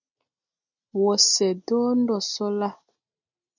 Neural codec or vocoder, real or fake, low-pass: none; real; 7.2 kHz